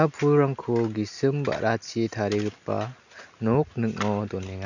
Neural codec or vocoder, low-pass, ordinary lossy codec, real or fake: none; 7.2 kHz; none; real